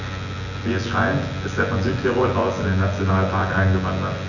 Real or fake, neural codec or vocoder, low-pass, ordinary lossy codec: fake; vocoder, 24 kHz, 100 mel bands, Vocos; 7.2 kHz; none